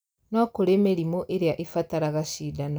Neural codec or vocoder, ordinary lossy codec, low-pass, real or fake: none; none; none; real